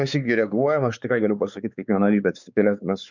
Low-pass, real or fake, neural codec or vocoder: 7.2 kHz; fake; codec, 16 kHz, 4 kbps, X-Codec, WavLM features, trained on Multilingual LibriSpeech